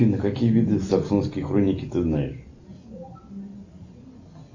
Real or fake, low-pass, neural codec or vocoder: real; 7.2 kHz; none